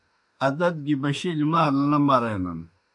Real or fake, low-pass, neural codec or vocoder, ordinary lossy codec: fake; 10.8 kHz; autoencoder, 48 kHz, 32 numbers a frame, DAC-VAE, trained on Japanese speech; MP3, 96 kbps